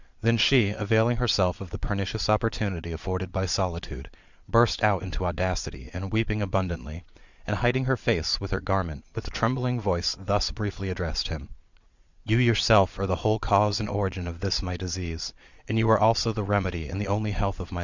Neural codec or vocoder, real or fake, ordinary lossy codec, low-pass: vocoder, 22.05 kHz, 80 mel bands, Vocos; fake; Opus, 64 kbps; 7.2 kHz